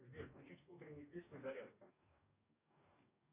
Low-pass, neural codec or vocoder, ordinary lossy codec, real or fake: 3.6 kHz; codec, 44.1 kHz, 2.6 kbps, DAC; AAC, 32 kbps; fake